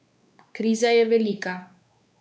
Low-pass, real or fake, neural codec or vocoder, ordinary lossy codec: none; fake; codec, 16 kHz, 4 kbps, X-Codec, WavLM features, trained on Multilingual LibriSpeech; none